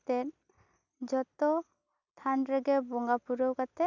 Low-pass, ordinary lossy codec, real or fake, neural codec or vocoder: 7.2 kHz; none; real; none